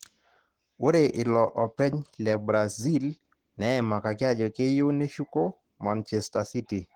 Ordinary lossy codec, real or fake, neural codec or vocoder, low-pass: Opus, 16 kbps; fake; codec, 44.1 kHz, 7.8 kbps, Pupu-Codec; 19.8 kHz